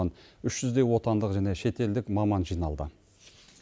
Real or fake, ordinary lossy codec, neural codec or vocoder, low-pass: real; none; none; none